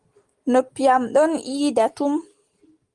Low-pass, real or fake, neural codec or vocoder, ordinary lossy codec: 10.8 kHz; real; none; Opus, 24 kbps